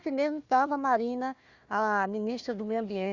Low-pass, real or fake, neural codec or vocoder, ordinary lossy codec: 7.2 kHz; fake; codec, 16 kHz, 1 kbps, FunCodec, trained on Chinese and English, 50 frames a second; Opus, 64 kbps